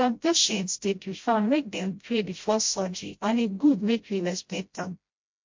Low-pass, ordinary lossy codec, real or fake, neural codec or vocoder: 7.2 kHz; MP3, 48 kbps; fake; codec, 16 kHz, 0.5 kbps, FreqCodec, smaller model